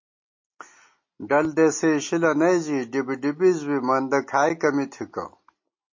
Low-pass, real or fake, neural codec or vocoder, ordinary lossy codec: 7.2 kHz; real; none; MP3, 32 kbps